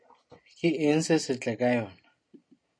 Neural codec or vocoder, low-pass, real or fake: none; 9.9 kHz; real